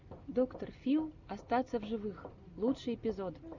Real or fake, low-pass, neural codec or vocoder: real; 7.2 kHz; none